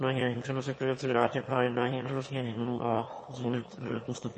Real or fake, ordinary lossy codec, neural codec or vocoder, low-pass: fake; MP3, 32 kbps; autoencoder, 22.05 kHz, a latent of 192 numbers a frame, VITS, trained on one speaker; 9.9 kHz